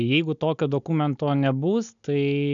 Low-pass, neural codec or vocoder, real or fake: 7.2 kHz; none; real